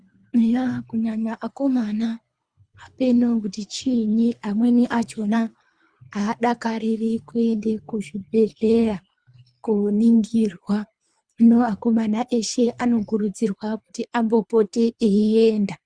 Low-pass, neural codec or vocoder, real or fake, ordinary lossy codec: 9.9 kHz; codec, 24 kHz, 3 kbps, HILCodec; fake; Opus, 64 kbps